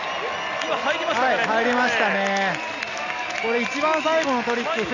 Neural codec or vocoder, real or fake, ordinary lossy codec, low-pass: none; real; none; 7.2 kHz